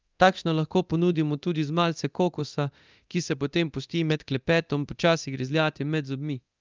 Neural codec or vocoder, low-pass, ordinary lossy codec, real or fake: codec, 24 kHz, 0.9 kbps, DualCodec; 7.2 kHz; Opus, 24 kbps; fake